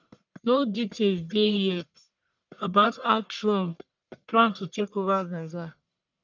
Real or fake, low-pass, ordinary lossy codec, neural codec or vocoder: fake; 7.2 kHz; none; codec, 44.1 kHz, 1.7 kbps, Pupu-Codec